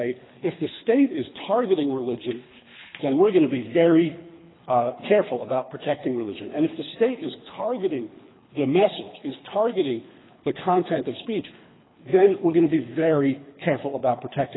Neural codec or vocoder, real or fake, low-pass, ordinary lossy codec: codec, 24 kHz, 3 kbps, HILCodec; fake; 7.2 kHz; AAC, 16 kbps